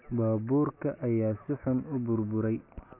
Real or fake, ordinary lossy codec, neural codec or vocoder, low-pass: real; none; none; 3.6 kHz